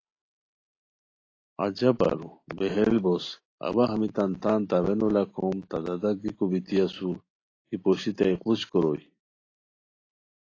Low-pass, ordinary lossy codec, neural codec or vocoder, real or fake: 7.2 kHz; AAC, 32 kbps; none; real